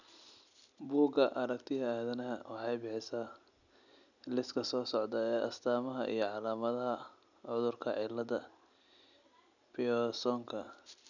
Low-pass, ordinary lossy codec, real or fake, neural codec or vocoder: 7.2 kHz; none; real; none